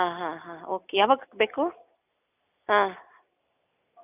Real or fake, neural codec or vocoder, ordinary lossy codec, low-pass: real; none; none; 3.6 kHz